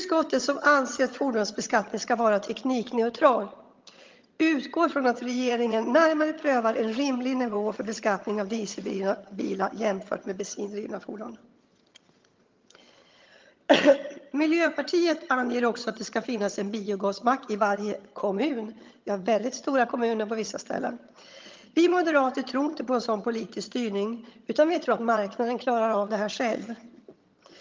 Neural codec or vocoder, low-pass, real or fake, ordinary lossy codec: vocoder, 22.05 kHz, 80 mel bands, HiFi-GAN; 7.2 kHz; fake; Opus, 32 kbps